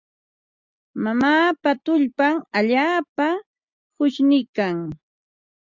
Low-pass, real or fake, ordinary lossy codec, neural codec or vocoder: 7.2 kHz; real; Opus, 64 kbps; none